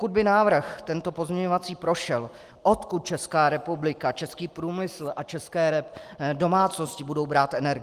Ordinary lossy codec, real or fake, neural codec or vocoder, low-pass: Opus, 24 kbps; real; none; 14.4 kHz